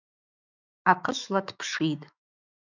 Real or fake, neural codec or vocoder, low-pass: fake; autoencoder, 48 kHz, 128 numbers a frame, DAC-VAE, trained on Japanese speech; 7.2 kHz